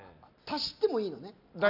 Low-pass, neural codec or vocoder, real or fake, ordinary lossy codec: 5.4 kHz; none; real; none